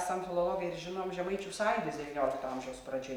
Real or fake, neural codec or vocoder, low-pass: real; none; 19.8 kHz